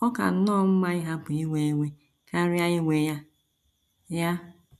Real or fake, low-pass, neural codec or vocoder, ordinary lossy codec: real; 14.4 kHz; none; none